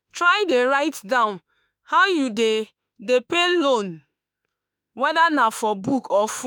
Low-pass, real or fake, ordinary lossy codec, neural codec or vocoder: none; fake; none; autoencoder, 48 kHz, 32 numbers a frame, DAC-VAE, trained on Japanese speech